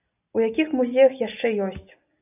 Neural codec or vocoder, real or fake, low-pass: none; real; 3.6 kHz